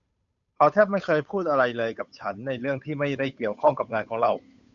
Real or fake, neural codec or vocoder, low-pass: fake; codec, 16 kHz, 8 kbps, FunCodec, trained on Chinese and English, 25 frames a second; 7.2 kHz